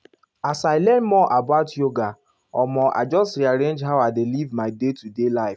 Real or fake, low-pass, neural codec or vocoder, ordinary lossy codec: real; none; none; none